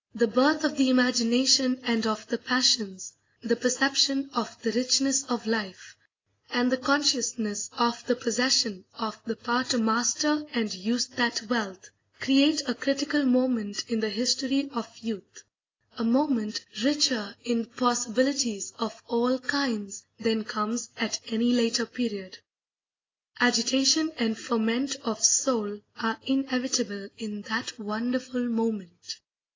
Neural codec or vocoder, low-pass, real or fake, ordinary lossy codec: none; 7.2 kHz; real; AAC, 32 kbps